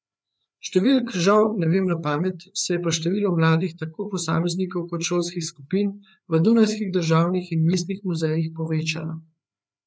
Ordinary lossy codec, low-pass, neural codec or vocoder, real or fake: none; none; codec, 16 kHz, 4 kbps, FreqCodec, larger model; fake